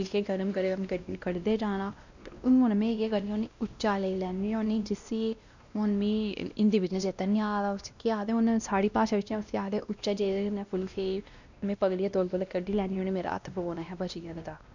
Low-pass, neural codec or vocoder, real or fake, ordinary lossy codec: 7.2 kHz; codec, 16 kHz, 1 kbps, X-Codec, WavLM features, trained on Multilingual LibriSpeech; fake; none